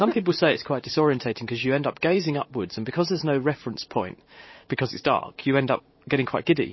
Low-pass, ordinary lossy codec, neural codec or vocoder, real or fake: 7.2 kHz; MP3, 24 kbps; vocoder, 44.1 kHz, 128 mel bands every 512 samples, BigVGAN v2; fake